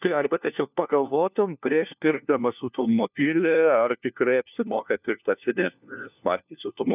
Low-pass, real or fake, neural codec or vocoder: 3.6 kHz; fake; codec, 16 kHz, 1 kbps, FunCodec, trained on LibriTTS, 50 frames a second